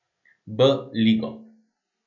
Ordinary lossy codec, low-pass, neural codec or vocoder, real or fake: none; 7.2 kHz; none; real